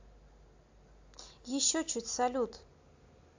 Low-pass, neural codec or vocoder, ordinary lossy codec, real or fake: 7.2 kHz; none; AAC, 48 kbps; real